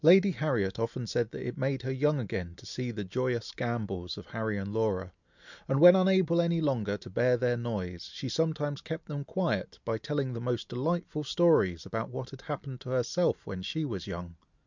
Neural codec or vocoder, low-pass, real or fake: none; 7.2 kHz; real